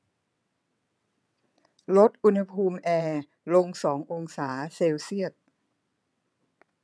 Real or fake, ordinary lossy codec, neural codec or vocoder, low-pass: fake; none; vocoder, 22.05 kHz, 80 mel bands, WaveNeXt; none